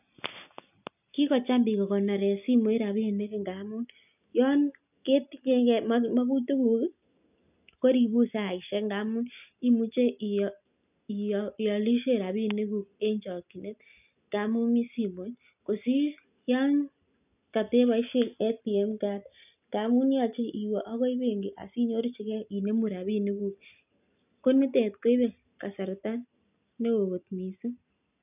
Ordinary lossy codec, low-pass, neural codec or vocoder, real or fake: none; 3.6 kHz; none; real